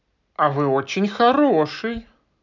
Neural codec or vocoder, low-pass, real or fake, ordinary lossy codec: none; 7.2 kHz; real; none